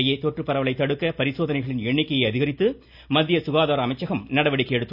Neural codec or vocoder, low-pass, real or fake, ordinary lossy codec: none; 5.4 kHz; real; none